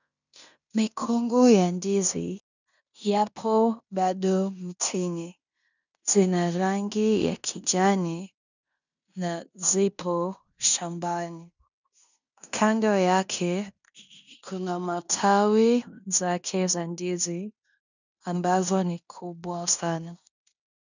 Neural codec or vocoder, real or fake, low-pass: codec, 16 kHz in and 24 kHz out, 0.9 kbps, LongCat-Audio-Codec, fine tuned four codebook decoder; fake; 7.2 kHz